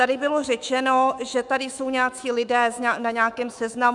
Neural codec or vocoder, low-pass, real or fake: autoencoder, 48 kHz, 128 numbers a frame, DAC-VAE, trained on Japanese speech; 10.8 kHz; fake